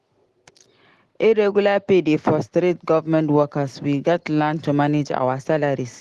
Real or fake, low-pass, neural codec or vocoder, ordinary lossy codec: real; 10.8 kHz; none; Opus, 16 kbps